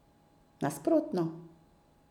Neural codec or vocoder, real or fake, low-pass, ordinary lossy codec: none; real; 19.8 kHz; none